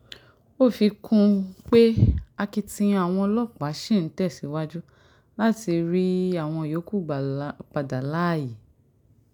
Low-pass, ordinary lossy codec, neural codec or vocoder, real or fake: 19.8 kHz; none; none; real